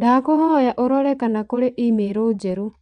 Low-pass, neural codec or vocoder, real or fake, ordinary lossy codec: 9.9 kHz; vocoder, 22.05 kHz, 80 mel bands, WaveNeXt; fake; none